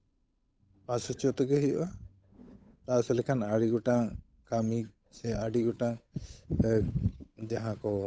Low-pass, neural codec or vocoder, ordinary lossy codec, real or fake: none; codec, 16 kHz, 8 kbps, FunCodec, trained on Chinese and English, 25 frames a second; none; fake